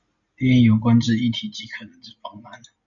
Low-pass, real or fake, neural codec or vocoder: 7.2 kHz; real; none